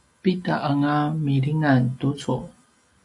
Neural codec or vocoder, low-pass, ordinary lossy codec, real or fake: none; 10.8 kHz; AAC, 64 kbps; real